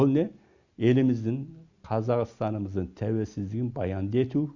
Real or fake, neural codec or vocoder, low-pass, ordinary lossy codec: real; none; 7.2 kHz; none